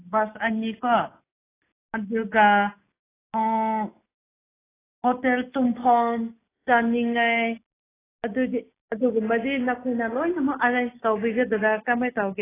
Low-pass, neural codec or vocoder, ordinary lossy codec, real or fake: 3.6 kHz; none; AAC, 16 kbps; real